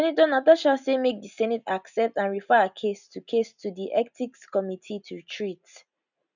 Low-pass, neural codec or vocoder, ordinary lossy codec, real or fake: 7.2 kHz; none; none; real